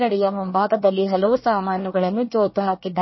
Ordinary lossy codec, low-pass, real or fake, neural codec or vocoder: MP3, 24 kbps; 7.2 kHz; fake; codec, 24 kHz, 1 kbps, SNAC